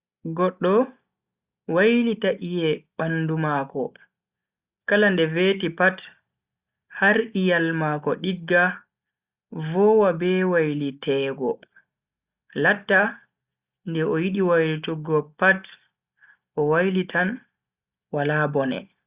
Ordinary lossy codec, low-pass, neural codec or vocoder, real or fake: Opus, 64 kbps; 3.6 kHz; none; real